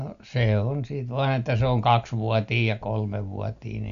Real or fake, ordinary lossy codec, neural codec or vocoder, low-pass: real; none; none; 7.2 kHz